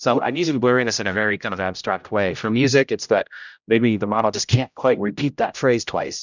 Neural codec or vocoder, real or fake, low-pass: codec, 16 kHz, 0.5 kbps, X-Codec, HuBERT features, trained on general audio; fake; 7.2 kHz